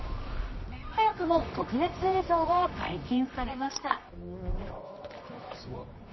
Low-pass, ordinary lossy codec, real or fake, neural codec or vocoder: 7.2 kHz; MP3, 24 kbps; fake; codec, 24 kHz, 0.9 kbps, WavTokenizer, medium music audio release